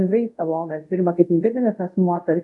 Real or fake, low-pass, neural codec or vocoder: fake; 10.8 kHz; codec, 24 kHz, 0.5 kbps, DualCodec